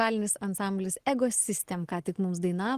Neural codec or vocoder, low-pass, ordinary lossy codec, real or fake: none; 14.4 kHz; Opus, 16 kbps; real